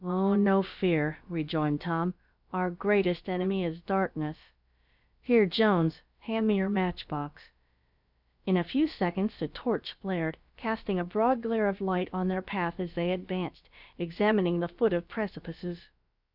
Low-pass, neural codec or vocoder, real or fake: 5.4 kHz; codec, 16 kHz, about 1 kbps, DyCAST, with the encoder's durations; fake